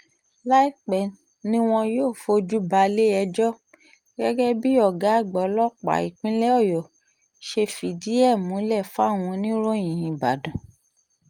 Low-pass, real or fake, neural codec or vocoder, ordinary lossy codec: 19.8 kHz; real; none; Opus, 32 kbps